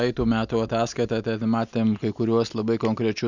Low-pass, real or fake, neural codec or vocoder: 7.2 kHz; real; none